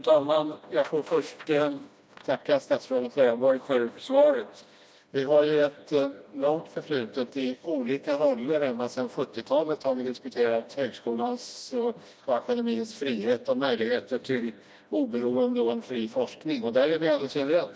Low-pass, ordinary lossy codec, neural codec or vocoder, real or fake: none; none; codec, 16 kHz, 1 kbps, FreqCodec, smaller model; fake